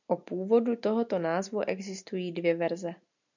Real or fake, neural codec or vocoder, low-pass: real; none; 7.2 kHz